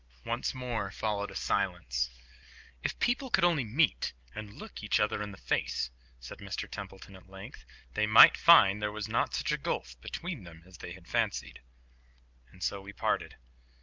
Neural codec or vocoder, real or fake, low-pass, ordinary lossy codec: none; real; 7.2 kHz; Opus, 32 kbps